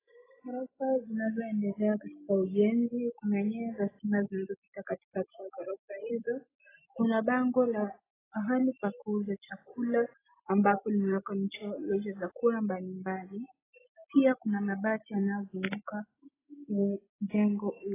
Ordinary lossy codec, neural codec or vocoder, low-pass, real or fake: AAC, 16 kbps; none; 3.6 kHz; real